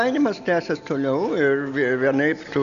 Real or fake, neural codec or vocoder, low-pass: real; none; 7.2 kHz